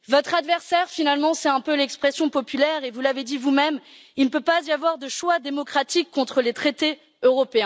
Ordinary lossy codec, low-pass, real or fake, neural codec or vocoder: none; none; real; none